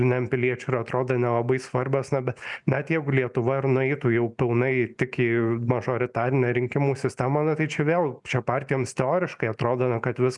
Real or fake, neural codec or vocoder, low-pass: real; none; 10.8 kHz